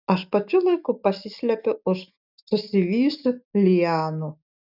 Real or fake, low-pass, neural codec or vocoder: real; 5.4 kHz; none